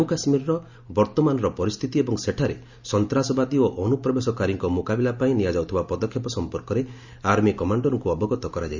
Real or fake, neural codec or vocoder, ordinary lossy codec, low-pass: real; none; Opus, 64 kbps; 7.2 kHz